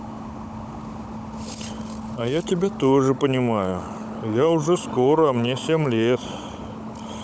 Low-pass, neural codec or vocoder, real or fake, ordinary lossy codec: none; codec, 16 kHz, 16 kbps, FunCodec, trained on Chinese and English, 50 frames a second; fake; none